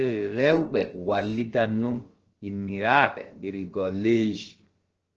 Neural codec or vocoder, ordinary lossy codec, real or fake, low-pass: codec, 16 kHz, about 1 kbps, DyCAST, with the encoder's durations; Opus, 16 kbps; fake; 7.2 kHz